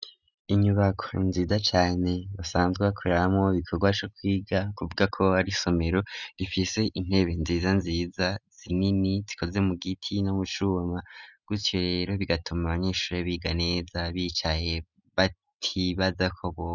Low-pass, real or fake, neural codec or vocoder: 7.2 kHz; real; none